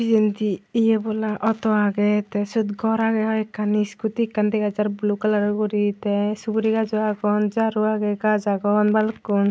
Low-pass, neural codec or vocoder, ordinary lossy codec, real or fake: none; none; none; real